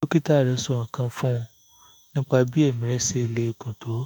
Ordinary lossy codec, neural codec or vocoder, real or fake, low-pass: none; autoencoder, 48 kHz, 32 numbers a frame, DAC-VAE, trained on Japanese speech; fake; none